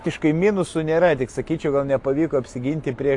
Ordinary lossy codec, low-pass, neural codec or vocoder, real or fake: AAC, 64 kbps; 10.8 kHz; none; real